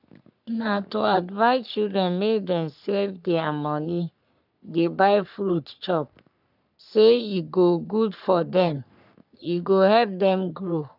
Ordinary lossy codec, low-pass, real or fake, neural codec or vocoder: none; 5.4 kHz; fake; codec, 44.1 kHz, 3.4 kbps, Pupu-Codec